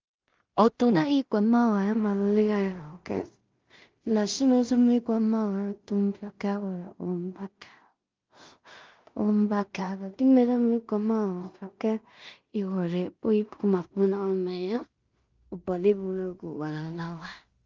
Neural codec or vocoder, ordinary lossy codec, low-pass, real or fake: codec, 16 kHz in and 24 kHz out, 0.4 kbps, LongCat-Audio-Codec, two codebook decoder; Opus, 32 kbps; 7.2 kHz; fake